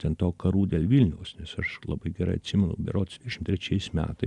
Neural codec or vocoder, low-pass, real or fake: none; 10.8 kHz; real